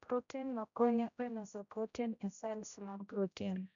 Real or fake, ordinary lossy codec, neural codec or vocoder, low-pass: fake; none; codec, 16 kHz, 0.5 kbps, X-Codec, HuBERT features, trained on general audio; 7.2 kHz